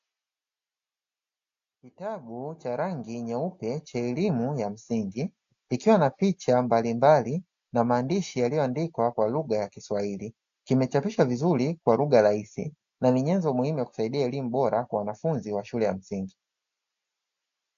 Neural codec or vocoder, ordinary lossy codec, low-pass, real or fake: none; AAC, 64 kbps; 7.2 kHz; real